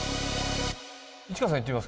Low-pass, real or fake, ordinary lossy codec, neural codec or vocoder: none; real; none; none